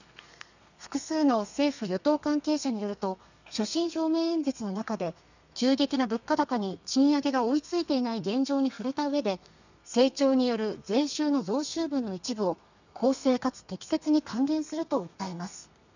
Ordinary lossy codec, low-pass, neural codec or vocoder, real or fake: none; 7.2 kHz; codec, 32 kHz, 1.9 kbps, SNAC; fake